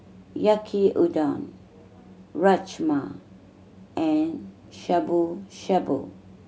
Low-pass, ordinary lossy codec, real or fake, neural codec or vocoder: none; none; real; none